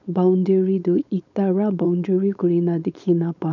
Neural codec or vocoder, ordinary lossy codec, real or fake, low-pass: codec, 16 kHz, 4.8 kbps, FACodec; none; fake; 7.2 kHz